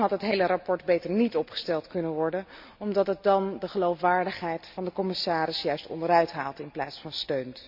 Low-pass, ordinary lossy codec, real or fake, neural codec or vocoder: 5.4 kHz; MP3, 48 kbps; real; none